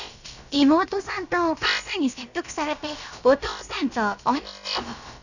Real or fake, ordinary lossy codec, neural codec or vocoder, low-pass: fake; none; codec, 16 kHz, about 1 kbps, DyCAST, with the encoder's durations; 7.2 kHz